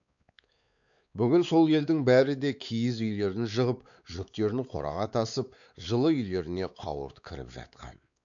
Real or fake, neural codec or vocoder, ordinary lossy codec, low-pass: fake; codec, 16 kHz, 4 kbps, X-Codec, WavLM features, trained on Multilingual LibriSpeech; none; 7.2 kHz